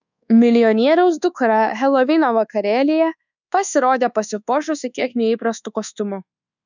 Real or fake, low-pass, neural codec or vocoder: fake; 7.2 kHz; codec, 24 kHz, 1.2 kbps, DualCodec